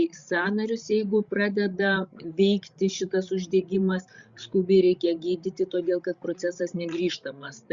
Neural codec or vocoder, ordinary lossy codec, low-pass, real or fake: codec, 16 kHz, 16 kbps, FreqCodec, larger model; Opus, 64 kbps; 7.2 kHz; fake